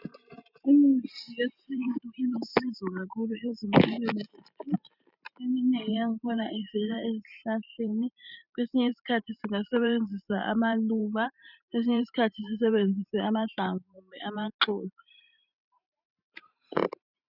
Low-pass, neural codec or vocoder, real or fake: 5.4 kHz; vocoder, 44.1 kHz, 128 mel bands every 512 samples, BigVGAN v2; fake